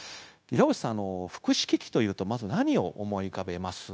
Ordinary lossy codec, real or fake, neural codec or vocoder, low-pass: none; fake; codec, 16 kHz, 0.9 kbps, LongCat-Audio-Codec; none